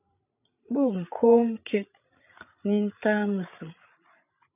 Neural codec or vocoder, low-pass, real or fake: codec, 16 kHz, 8 kbps, FreqCodec, larger model; 3.6 kHz; fake